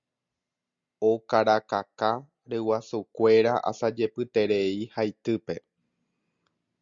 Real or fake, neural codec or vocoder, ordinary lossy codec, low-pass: real; none; Opus, 64 kbps; 7.2 kHz